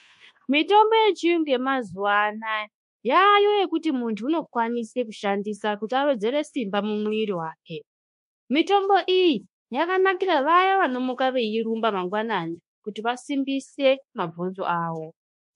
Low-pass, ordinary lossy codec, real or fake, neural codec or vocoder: 10.8 kHz; MP3, 48 kbps; fake; codec, 24 kHz, 1.2 kbps, DualCodec